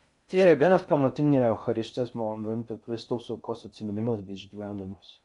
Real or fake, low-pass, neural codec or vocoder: fake; 10.8 kHz; codec, 16 kHz in and 24 kHz out, 0.6 kbps, FocalCodec, streaming, 2048 codes